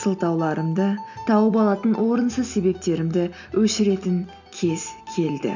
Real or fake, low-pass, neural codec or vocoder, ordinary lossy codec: real; 7.2 kHz; none; none